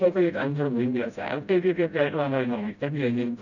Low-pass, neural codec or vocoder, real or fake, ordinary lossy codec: 7.2 kHz; codec, 16 kHz, 0.5 kbps, FreqCodec, smaller model; fake; none